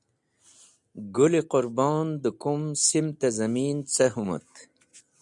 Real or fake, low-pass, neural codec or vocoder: real; 10.8 kHz; none